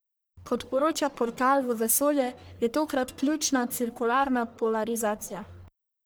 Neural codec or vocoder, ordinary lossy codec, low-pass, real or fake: codec, 44.1 kHz, 1.7 kbps, Pupu-Codec; none; none; fake